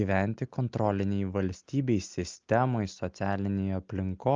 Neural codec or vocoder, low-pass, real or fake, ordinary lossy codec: none; 7.2 kHz; real; Opus, 24 kbps